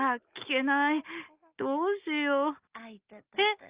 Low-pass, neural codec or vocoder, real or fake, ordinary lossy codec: 3.6 kHz; none; real; Opus, 32 kbps